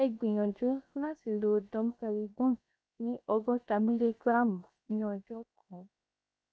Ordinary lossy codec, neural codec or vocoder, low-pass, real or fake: none; codec, 16 kHz, 0.7 kbps, FocalCodec; none; fake